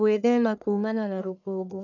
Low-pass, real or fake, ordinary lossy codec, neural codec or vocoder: 7.2 kHz; fake; none; codec, 44.1 kHz, 1.7 kbps, Pupu-Codec